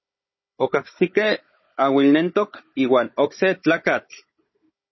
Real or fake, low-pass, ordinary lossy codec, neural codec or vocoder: fake; 7.2 kHz; MP3, 24 kbps; codec, 16 kHz, 16 kbps, FunCodec, trained on Chinese and English, 50 frames a second